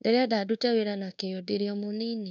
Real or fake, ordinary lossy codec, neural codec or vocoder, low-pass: fake; none; codec, 24 kHz, 1.2 kbps, DualCodec; 7.2 kHz